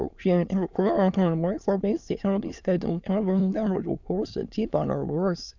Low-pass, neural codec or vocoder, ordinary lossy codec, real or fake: 7.2 kHz; autoencoder, 22.05 kHz, a latent of 192 numbers a frame, VITS, trained on many speakers; none; fake